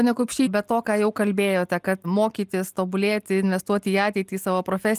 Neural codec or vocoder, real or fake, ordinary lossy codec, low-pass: none; real; Opus, 32 kbps; 14.4 kHz